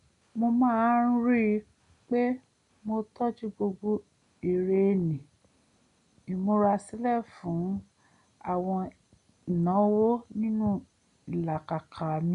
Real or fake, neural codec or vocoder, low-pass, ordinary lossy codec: real; none; 10.8 kHz; none